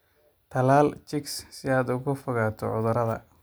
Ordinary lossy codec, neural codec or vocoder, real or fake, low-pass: none; none; real; none